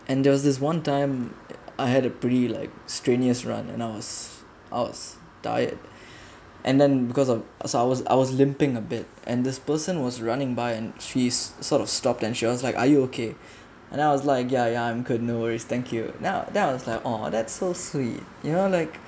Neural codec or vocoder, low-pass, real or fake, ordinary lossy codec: none; none; real; none